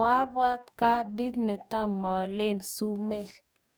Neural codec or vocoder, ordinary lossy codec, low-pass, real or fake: codec, 44.1 kHz, 2.6 kbps, DAC; none; none; fake